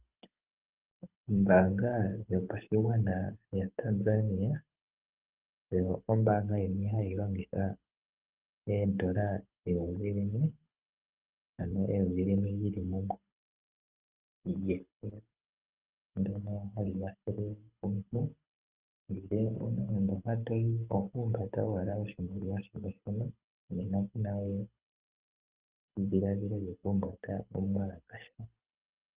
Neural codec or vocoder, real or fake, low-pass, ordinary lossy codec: codec, 24 kHz, 6 kbps, HILCodec; fake; 3.6 kHz; Opus, 32 kbps